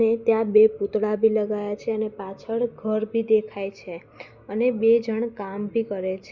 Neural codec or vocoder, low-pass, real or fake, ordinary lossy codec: none; 7.2 kHz; real; Opus, 64 kbps